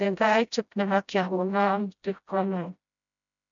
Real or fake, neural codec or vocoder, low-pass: fake; codec, 16 kHz, 0.5 kbps, FreqCodec, smaller model; 7.2 kHz